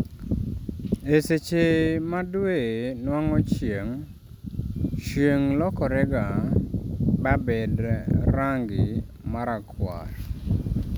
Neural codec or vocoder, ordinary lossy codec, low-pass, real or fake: none; none; none; real